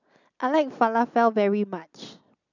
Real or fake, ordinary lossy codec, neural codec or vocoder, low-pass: real; none; none; 7.2 kHz